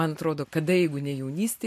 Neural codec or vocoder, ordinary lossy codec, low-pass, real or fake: none; MP3, 64 kbps; 14.4 kHz; real